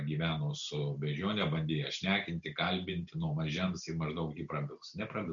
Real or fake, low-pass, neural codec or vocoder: real; 7.2 kHz; none